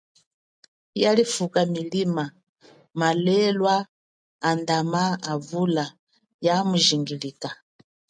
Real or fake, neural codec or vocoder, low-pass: real; none; 9.9 kHz